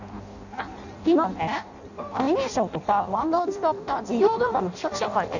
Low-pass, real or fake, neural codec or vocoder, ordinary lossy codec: 7.2 kHz; fake; codec, 16 kHz in and 24 kHz out, 0.6 kbps, FireRedTTS-2 codec; none